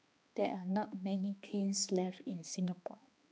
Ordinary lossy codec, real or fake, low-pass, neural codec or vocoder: none; fake; none; codec, 16 kHz, 2 kbps, X-Codec, HuBERT features, trained on balanced general audio